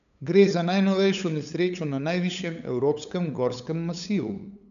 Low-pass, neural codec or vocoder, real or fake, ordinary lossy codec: 7.2 kHz; codec, 16 kHz, 8 kbps, FunCodec, trained on LibriTTS, 25 frames a second; fake; none